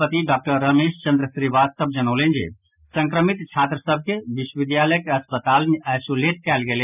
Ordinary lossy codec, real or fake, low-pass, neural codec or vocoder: none; real; 3.6 kHz; none